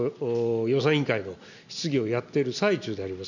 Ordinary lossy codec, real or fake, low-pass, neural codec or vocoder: none; real; 7.2 kHz; none